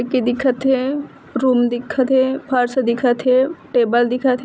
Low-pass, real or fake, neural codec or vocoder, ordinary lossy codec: none; real; none; none